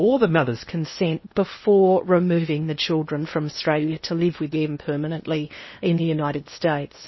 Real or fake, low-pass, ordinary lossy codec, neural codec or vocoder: fake; 7.2 kHz; MP3, 24 kbps; codec, 16 kHz in and 24 kHz out, 0.8 kbps, FocalCodec, streaming, 65536 codes